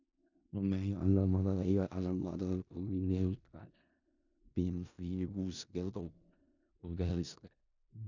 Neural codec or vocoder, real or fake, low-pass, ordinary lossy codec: codec, 16 kHz in and 24 kHz out, 0.4 kbps, LongCat-Audio-Codec, four codebook decoder; fake; 7.2 kHz; none